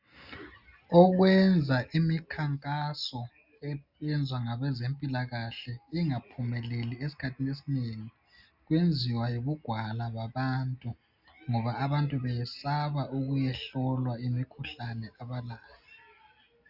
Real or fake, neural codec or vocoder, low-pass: real; none; 5.4 kHz